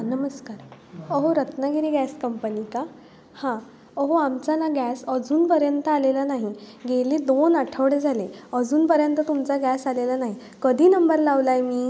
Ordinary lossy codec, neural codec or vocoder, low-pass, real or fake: none; none; none; real